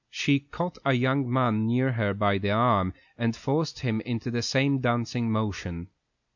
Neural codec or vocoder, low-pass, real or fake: none; 7.2 kHz; real